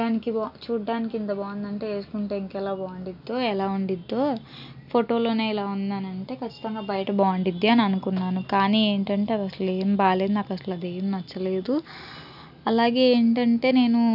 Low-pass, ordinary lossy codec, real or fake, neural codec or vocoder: 5.4 kHz; none; real; none